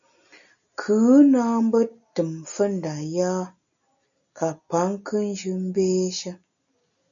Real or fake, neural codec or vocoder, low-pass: real; none; 7.2 kHz